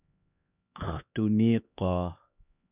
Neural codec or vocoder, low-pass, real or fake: codec, 16 kHz, 2 kbps, X-Codec, HuBERT features, trained on LibriSpeech; 3.6 kHz; fake